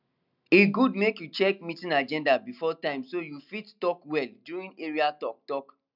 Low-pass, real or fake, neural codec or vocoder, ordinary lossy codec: 5.4 kHz; real; none; none